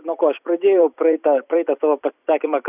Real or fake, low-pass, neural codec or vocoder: fake; 3.6 kHz; vocoder, 44.1 kHz, 128 mel bands every 256 samples, BigVGAN v2